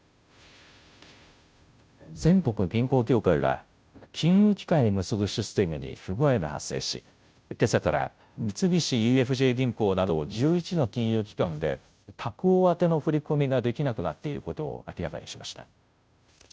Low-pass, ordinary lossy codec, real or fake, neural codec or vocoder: none; none; fake; codec, 16 kHz, 0.5 kbps, FunCodec, trained on Chinese and English, 25 frames a second